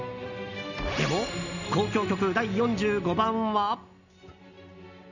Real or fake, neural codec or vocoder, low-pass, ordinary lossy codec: real; none; 7.2 kHz; none